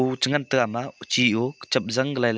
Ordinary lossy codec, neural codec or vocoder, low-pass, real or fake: none; none; none; real